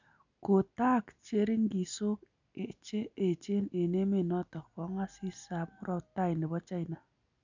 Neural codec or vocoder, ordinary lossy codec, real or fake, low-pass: none; none; real; 7.2 kHz